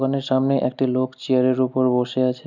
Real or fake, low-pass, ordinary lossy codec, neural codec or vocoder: real; 7.2 kHz; none; none